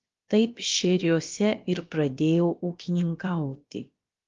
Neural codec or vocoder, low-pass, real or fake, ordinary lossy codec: codec, 16 kHz, about 1 kbps, DyCAST, with the encoder's durations; 7.2 kHz; fake; Opus, 32 kbps